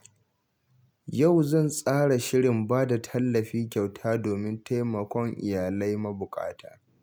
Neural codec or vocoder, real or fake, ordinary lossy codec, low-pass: none; real; none; none